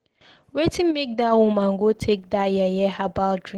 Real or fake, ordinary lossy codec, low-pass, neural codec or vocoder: real; Opus, 16 kbps; 19.8 kHz; none